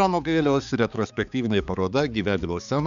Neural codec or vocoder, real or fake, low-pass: codec, 16 kHz, 4 kbps, X-Codec, HuBERT features, trained on balanced general audio; fake; 7.2 kHz